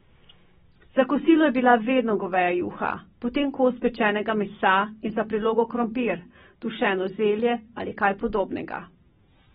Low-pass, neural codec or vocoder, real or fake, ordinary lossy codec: 7.2 kHz; none; real; AAC, 16 kbps